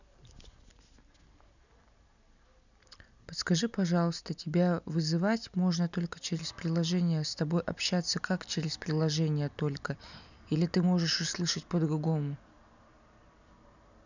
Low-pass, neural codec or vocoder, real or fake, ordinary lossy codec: 7.2 kHz; none; real; none